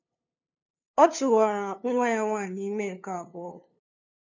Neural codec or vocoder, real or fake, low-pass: codec, 16 kHz, 2 kbps, FunCodec, trained on LibriTTS, 25 frames a second; fake; 7.2 kHz